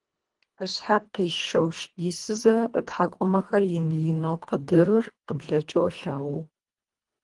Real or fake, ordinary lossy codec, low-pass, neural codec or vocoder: fake; Opus, 24 kbps; 10.8 kHz; codec, 24 kHz, 1.5 kbps, HILCodec